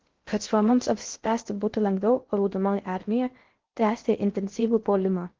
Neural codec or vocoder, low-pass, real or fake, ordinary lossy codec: codec, 16 kHz in and 24 kHz out, 0.6 kbps, FocalCodec, streaming, 4096 codes; 7.2 kHz; fake; Opus, 16 kbps